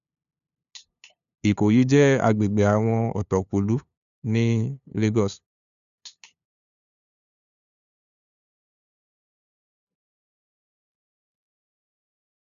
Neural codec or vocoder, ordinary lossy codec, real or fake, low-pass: codec, 16 kHz, 2 kbps, FunCodec, trained on LibriTTS, 25 frames a second; none; fake; 7.2 kHz